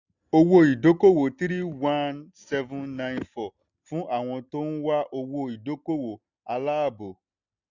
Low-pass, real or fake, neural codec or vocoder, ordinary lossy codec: 7.2 kHz; real; none; none